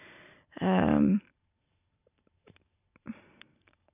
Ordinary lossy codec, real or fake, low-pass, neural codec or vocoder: none; fake; 3.6 kHz; vocoder, 44.1 kHz, 128 mel bands every 512 samples, BigVGAN v2